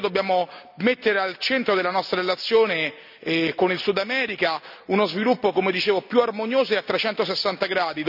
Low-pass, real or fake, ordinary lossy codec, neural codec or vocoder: 5.4 kHz; real; none; none